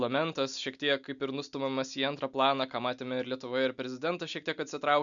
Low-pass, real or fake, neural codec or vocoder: 7.2 kHz; real; none